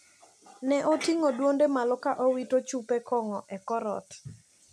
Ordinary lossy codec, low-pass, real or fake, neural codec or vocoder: none; 14.4 kHz; real; none